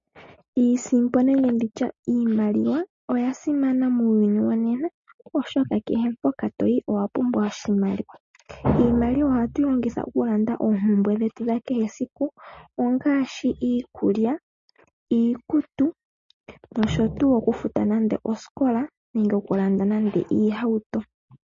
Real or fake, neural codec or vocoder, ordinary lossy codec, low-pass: real; none; MP3, 32 kbps; 7.2 kHz